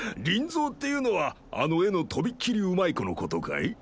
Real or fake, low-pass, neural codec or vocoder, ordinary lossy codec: real; none; none; none